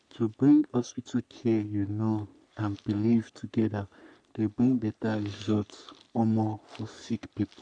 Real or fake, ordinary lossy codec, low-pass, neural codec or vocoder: fake; none; 9.9 kHz; codec, 44.1 kHz, 3.4 kbps, Pupu-Codec